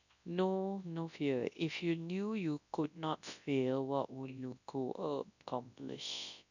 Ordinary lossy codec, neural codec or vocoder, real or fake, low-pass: none; codec, 24 kHz, 0.9 kbps, WavTokenizer, large speech release; fake; 7.2 kHz